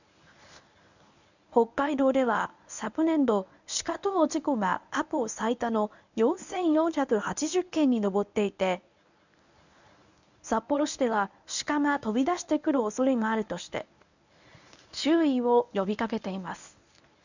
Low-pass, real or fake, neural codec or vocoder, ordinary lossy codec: 7.2 kHz; fake; codec, 24 kHz, 0.9 kbps, WavTokenizer, medium speech release version 1; none